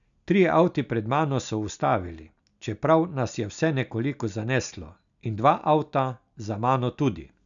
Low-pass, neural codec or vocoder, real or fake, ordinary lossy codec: 7.2 kHz; none; real; none